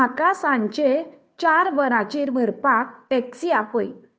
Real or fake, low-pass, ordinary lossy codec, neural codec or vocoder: fake; none; none; codec, 16 kHz, 2 kbps, FunCodec, trained on Chinese and English, 25 frames a second